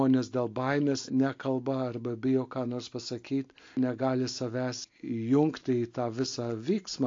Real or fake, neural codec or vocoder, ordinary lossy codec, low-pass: real; none; AAC, 48 kbps; 7.2 kHz